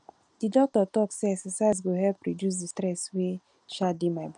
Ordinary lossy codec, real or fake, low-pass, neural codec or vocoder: none; real; 9.9 kHz; none